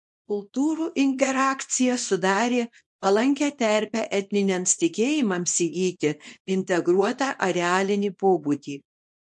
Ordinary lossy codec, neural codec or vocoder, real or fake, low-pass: MP3, 48 kbps; codec, 24 kHz, 0.9 kbps, WavTokenizer, small release; fake; 10.8 kHz